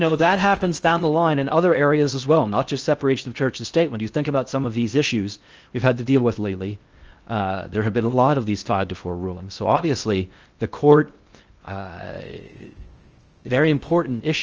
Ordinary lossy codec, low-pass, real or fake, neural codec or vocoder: Opus, 24 kbps; 7.2 kHz; fake; codec, 16 kHz in and 24 kHz out, 0.6 kbps, FocalCodec, streaming, 4096 codes